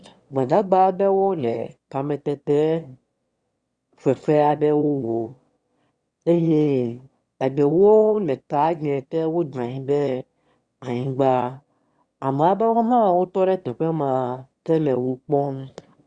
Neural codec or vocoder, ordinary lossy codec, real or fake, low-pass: autoencoder, 22.05 kHz, a latent of 192 numbers a frame, VITS, trained on one speaker; Opus, 64 kbps; fake; 9.9 kHz